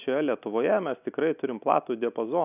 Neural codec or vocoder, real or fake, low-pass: none; real; 3.6 kHz